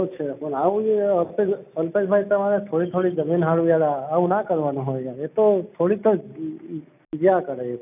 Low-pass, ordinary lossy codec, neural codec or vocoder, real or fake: 3.6 kHz; none; none; real